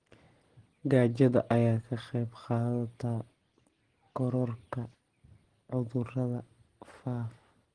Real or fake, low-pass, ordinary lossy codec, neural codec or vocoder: real; 9.9 kHz; Opus, 16 kbps; none